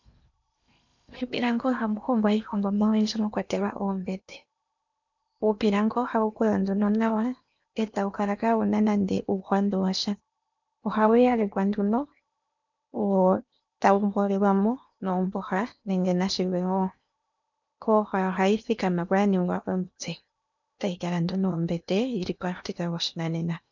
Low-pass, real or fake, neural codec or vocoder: 7.2 kHz; fake; codec, 16 kHz in and 24 kHz out, 0.8 kbps, FocalCodec, streaming, 65536 codes